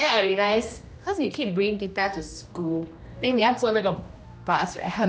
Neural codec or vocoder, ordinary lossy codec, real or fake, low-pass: codec, 16 kHz, 1 kbps, X-Codec, HuBERT features, trained on general audio; none; fake; none